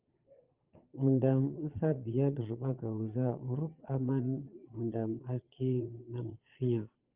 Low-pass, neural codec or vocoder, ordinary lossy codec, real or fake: 3.6 kHz; vocoder, 22.05 kHz, 80 mel bands, Vocos; Opus, 24 kbps; fake